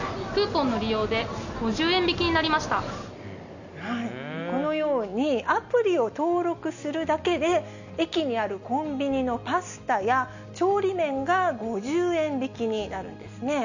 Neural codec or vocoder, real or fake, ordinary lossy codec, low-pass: none; real; none; 7.2 kHz